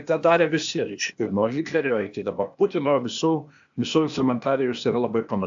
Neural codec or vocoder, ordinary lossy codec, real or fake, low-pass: codec, 16 kHz, 0.8 kbps, ZipCodec; AAC, 64 kbps; fake; 7.2 kHz